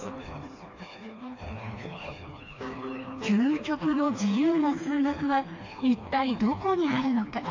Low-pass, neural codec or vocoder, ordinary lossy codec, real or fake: 7.2 kHz; codec, 16 kHz, 2 kbps, FreqCodec, smaller model; AAC, 48 kbps; fake